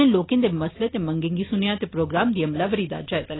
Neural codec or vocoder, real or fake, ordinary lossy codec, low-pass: none; real; AAC, 16 kbps; 7.2 kHz